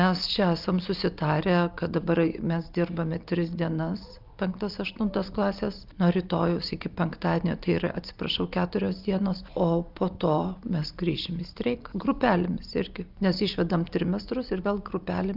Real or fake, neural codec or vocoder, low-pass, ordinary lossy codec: real; none; 5.4 kHz; Opus, 24 kbps